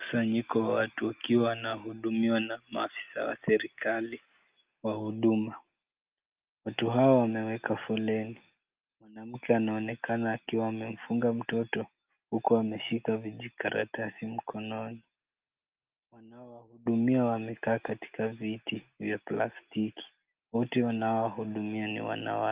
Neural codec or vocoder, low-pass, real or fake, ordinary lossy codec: none; 3.6 kHz; real; Opus, 32 kbps